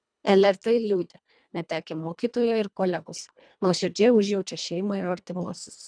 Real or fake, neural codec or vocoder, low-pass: fake; codec, 24 kHz, 1.5 kbps, HILCodec; 9.9 kHz